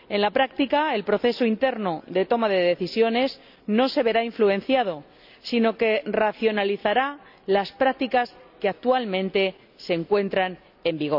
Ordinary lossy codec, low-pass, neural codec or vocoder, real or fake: none; 5.4 kHz; none; real